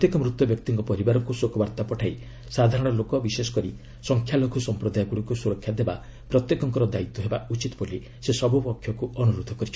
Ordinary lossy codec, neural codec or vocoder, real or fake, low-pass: none; none; real; none